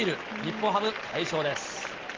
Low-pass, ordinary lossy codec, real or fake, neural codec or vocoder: 7.2 kHz; Opus, 16 kbps; real; none